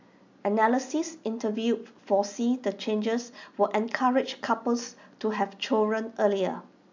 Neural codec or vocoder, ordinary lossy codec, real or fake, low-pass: none; MP3, 64 kbps; real; 7.2 kHz